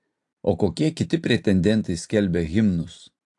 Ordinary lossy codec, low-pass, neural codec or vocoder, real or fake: AAC, 64 kbps; 10.8 kHz; none; real